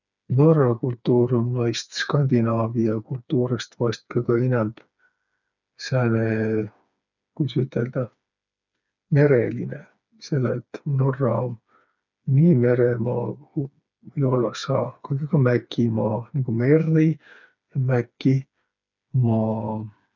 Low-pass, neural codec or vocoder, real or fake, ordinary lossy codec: 7.2 kHz; codec, 16 kHz, 4 kbps, FreqCodec, smaller model; fake; none